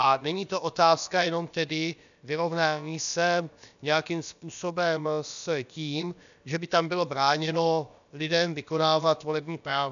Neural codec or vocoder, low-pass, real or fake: codec, 16 kHz, about 1 kbps, DyCAST, with the encoder's durations; 7.2 kHz; fake